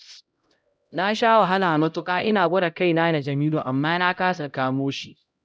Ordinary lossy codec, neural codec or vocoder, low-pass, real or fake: none; codec, 16 kHz, 0.5 kbps, X-Codec, HuBERT features, trained on LibriSpeech; none; fake